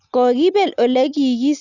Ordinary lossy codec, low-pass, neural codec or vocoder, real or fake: Opus, 64 kbps; 7.2 kHz; none; real